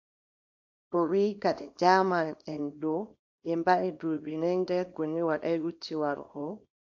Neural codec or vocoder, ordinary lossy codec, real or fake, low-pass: codec, 24 kHz, 0.9 kbps, WavTokenizer, small release; AAC, 48 kbps; fake; 7.2 kHz